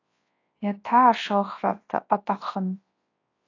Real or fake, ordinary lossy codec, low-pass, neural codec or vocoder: fake; AAC, 32 kbps; 7.2 kHz; codec, 24 kHz, 0.9 kbps, WavTokenizer, large speech release